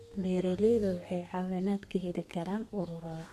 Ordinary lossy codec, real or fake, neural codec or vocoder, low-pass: none; fake; codec, 32 kHz, 1.9 kbps, SNAC; 14.4 kHz